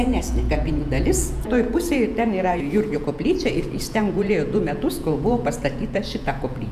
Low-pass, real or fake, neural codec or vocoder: 14.4 kHz; fake; vocoder, 48 kHz, 128 mel bands, Vocos